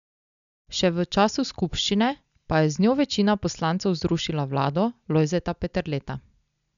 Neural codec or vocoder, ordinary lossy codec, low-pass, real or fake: none; none; 7.2 kHz; real